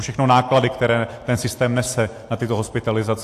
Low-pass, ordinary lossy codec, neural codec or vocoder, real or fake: 14.4 kHz; AAC, 64 kbps; none; real